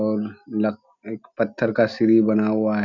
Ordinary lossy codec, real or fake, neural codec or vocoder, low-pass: none; real; none; 7.2 kHz